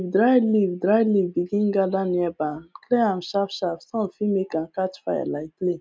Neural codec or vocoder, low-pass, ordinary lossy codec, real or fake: none; none; none; real